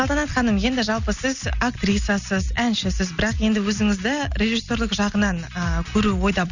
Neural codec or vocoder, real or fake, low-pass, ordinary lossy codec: none; real; 7.2 kHz; none